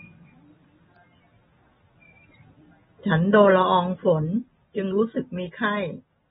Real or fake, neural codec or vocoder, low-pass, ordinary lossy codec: real; none; 14.4 kHz; AAC, 16 kbps